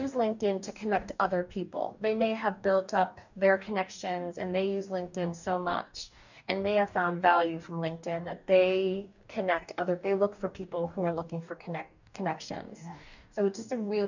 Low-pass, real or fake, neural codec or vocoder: 7.2 kHz; fake; codec, 44.1 kHz, 2.6 kbps, DAC